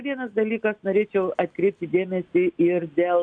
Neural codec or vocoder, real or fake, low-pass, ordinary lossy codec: none; real; 9.9 kHz; AAC, 64 kbps